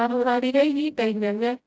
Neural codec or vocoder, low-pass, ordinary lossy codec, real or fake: codec, 16 kHz, 0.5 kbps, FreqCodec, smaller model; none; none; fake